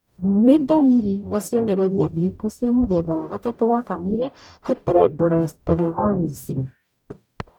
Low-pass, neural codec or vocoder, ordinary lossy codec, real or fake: 19.8 kHz; codec, 44.1 kHz, 0.9 kbps, DAC; none; fake